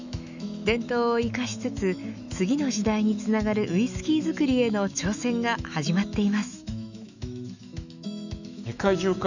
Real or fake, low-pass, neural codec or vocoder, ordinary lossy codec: fake; 7.2 kHz; autoencoder, 48 kHz, 128 numbers a frame, DAC-VAE, trained on Japanese speech; none